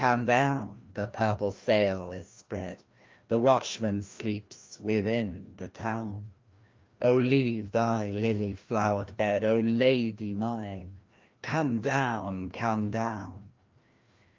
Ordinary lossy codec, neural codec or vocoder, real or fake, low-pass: Opus, 24 kbps; codec, 16 kHz, 1 kbps, FreqCodec, larger model; fake; 7.2 kHz